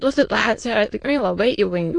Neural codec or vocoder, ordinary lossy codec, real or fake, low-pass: autoencoder, 22.05 kHz, a latent of 192 numbers a frame, VITS, trained on many speakers; AAC, 48 kbps; fake; 9.9 kHz